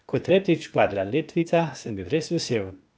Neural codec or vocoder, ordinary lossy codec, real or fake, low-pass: codec, 16 kHz, 0.8 kbps, ZipCodec; none; fake; none